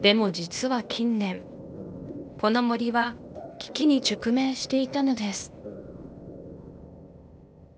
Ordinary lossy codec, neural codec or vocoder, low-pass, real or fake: none; codec, 16 kHz, 0.8 kbps, ZipCodec; none; fake